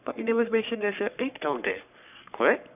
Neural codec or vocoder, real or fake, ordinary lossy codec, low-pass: codec, 44.1 kHz, 3.4 kbps, Pupu-Codec; fake; none; 3.6 kHz